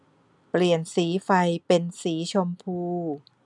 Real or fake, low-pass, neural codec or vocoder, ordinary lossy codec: real; 10.8 kHz; none; none